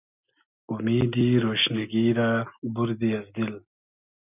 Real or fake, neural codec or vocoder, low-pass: real; none; 3.6 kHz